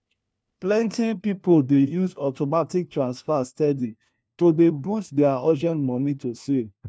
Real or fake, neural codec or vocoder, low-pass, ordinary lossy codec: fake; codec, 16 kHz, 1 kbps, FunCodec, trained on LibriTTS, 50 frames a second; none; none